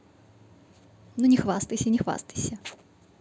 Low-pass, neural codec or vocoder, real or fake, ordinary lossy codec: none; none; real; none